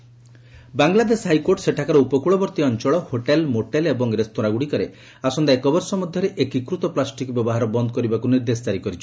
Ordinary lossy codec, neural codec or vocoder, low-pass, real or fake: none; none; none; real